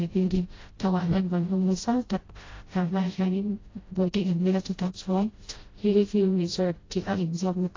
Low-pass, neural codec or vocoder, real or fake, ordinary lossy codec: 7.2 kHz; codec, 16 kHz, 0.5 kbps, FreqCodec, smaller model; fake; AAC, 32 kbps